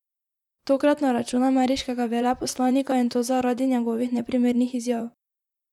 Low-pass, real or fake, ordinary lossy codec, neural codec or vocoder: 19.8 kHz; fake; none; vocoder, 44.1 kHz, 128 mel bands, Pupu-Vocoder